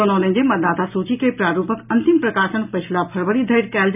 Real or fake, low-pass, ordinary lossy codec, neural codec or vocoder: real; 3.6 kHz; none; none